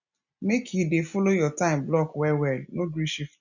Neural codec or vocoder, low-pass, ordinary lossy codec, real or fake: none; 7.2 kHz; none; real